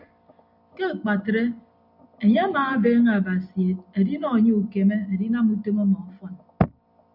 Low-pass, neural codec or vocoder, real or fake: 5.4 kHz; none; real